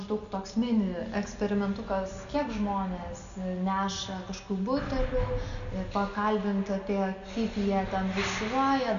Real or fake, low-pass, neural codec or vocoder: real; 7.2 kHz; none